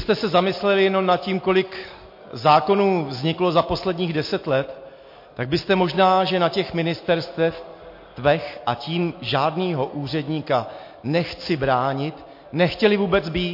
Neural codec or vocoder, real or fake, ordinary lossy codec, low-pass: none; real; MP3, 32 kbps; 5.4 kHz